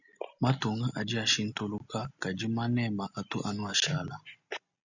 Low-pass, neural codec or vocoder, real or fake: 7.2 kHz; none; real